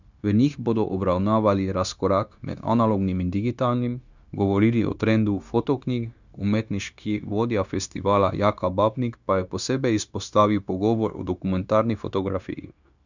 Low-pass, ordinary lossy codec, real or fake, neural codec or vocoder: 7.2 kHz; none; fake; codec, 16 kHz, 0.9 kbps, LongCat-Audio-Codec